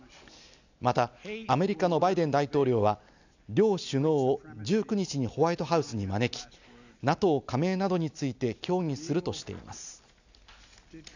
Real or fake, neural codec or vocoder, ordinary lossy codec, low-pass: real; none; none; 7.2 kHz